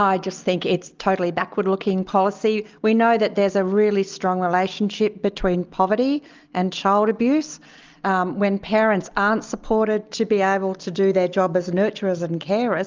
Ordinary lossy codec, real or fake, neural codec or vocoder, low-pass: Opus, 24 kbps; real; none; 7.2 kHz